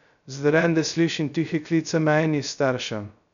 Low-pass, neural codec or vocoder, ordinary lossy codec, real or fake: 7.2 kHz; codec, 16 kHz, 0.2 kbps, FocalCodec; none; fake